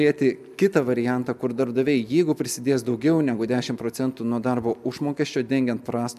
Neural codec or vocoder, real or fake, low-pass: none; real; 14.4 kHz